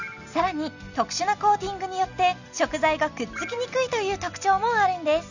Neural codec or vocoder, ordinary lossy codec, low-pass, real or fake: none; none; 7.2 kHz; real